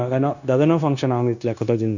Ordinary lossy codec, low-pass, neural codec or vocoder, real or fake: none; 7.2 kHz; codec, 16 kHz, 0.9 kbps, LongCat-Audio-Codec; fake